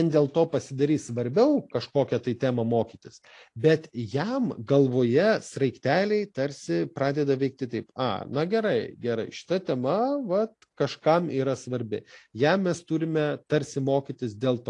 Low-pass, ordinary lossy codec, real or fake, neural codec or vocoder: 10.8 kHz; AAC, 48 kbps; real; none